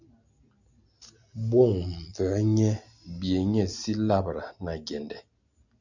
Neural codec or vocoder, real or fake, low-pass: none; real; 7.2 kHz